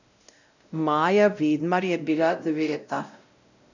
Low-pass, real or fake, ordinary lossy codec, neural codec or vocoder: 7.2 kHz; fake; none; codec, 16 kHz, 0.5 kbps, X-Codec, WavLM features, trained on Multilingual LibriSpeech